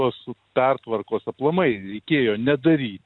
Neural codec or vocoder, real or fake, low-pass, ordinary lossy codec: none; real; 9.9 kHz; MP3, 96 kbps